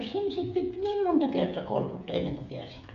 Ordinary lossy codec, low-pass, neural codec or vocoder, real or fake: MP3, 96 kbps; 7.2 kHz; codec, 16 kHz, 8 kbps, FreqCodec, smaller model; fake